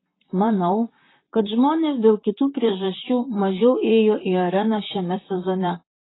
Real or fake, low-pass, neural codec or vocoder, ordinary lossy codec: fake; 7.2 kHz; vocoder, 44.1 kHz, 128 mel bands, Pupu-Vocoder; AAC, 16 kbps